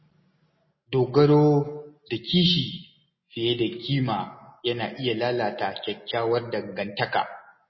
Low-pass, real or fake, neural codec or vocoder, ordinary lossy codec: 7.2 kHz; real; none; MP3, 24 kbps